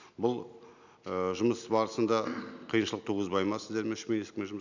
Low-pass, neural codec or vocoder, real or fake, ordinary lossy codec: 7.2 kHz; none; real; none